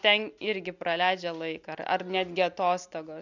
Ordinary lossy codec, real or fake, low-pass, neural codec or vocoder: MP3, 64 kbps; real; 7.2 kHz; none